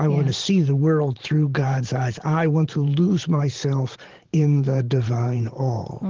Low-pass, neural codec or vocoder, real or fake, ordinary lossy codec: 7.2 kHz; vocoder, 44.1 kHz, 128 mel bands every 512 samples, BigVGAN v2; fake; Opus, 16 kbps